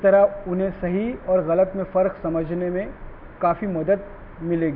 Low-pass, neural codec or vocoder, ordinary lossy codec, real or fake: 5.4 kHz; none; none; real